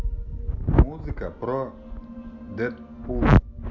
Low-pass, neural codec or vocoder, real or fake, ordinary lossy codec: 7.2 kHz; none; real; none